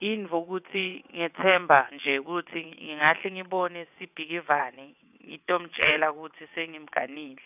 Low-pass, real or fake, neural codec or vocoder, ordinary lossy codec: 3.6 kHz; fake; vocoder, 22.05 kHz, 80 mel bands, WaveNeXt; none